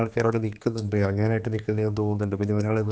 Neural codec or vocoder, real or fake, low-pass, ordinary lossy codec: codec, 16 kHz, 2 kbps, X-Codec, HuBERT features, trained on general audio; fake; none; none